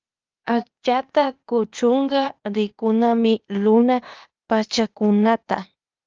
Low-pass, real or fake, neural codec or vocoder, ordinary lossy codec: 7.2 kHz; fake; codec, 16 kHz, 0.8 kbps, ZipCodec; Opus, 24 kbps